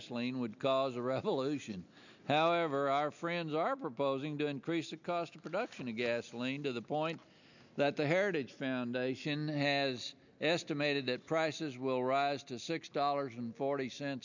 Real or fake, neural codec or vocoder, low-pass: real; none; 7.2 kHz